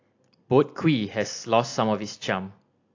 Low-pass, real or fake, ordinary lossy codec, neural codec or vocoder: 7.2 kHz; real; AAC, 48 kbps; none